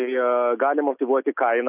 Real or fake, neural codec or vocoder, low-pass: real; none; 3.6 kHz